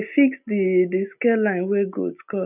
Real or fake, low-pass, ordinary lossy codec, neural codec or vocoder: real; 3.6 kHz; none; none